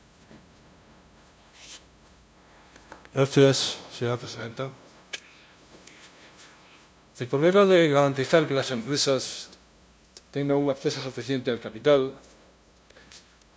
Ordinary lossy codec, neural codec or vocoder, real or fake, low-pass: none; codec, 16 kHz, 0.5 kbps, FunCodec, trained on LibriTTS, 25 frames a second; fake; none